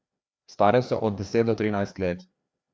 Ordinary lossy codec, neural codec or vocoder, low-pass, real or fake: none; codec, 16 kHz, 2 kbps, FreqCodec, larger model; none; fake